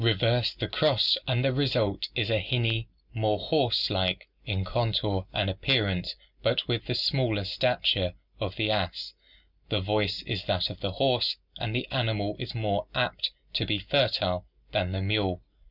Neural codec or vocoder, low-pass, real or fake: none; 5.4 kHz; real